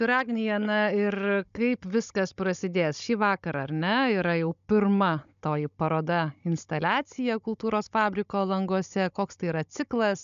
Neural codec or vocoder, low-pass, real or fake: codec, 16 kHz, 16 kbps, FunCodec, trained on Chinese and English, 50 frames a second; 7.2 kHz; fake